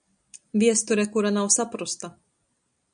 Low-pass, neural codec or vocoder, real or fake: 9.9 kHz; none; real